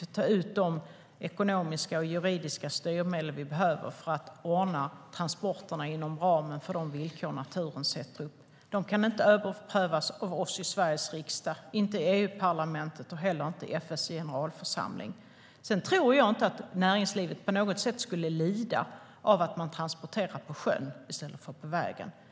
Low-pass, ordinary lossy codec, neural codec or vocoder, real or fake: none; none; none; real